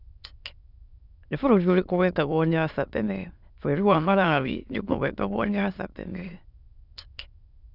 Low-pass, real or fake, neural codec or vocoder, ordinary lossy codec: 5.4 kHz; fake; autoencoder, 22.05 kHz, a latent of 192 numbers a frame, VITS, trained on many speakers; none